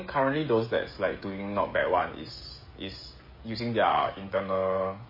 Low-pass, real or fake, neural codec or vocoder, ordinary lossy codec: 5.4 kHz; fake; codec, 16 kHz, 16 kbps, FreqCodec, smaller model; MP3, 24 kbps